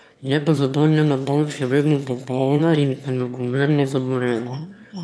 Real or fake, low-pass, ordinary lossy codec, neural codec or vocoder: fake; none; none; autoencoder, 22.05 kHz, a latent of 192 numbers a frame, VITS, trained on one speaker